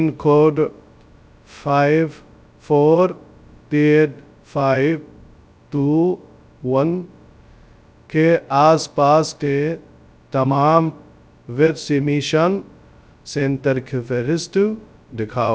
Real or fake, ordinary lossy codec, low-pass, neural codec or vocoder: fake; none; none; codec, 16 kHz, 0.2 kbps, FocalCodec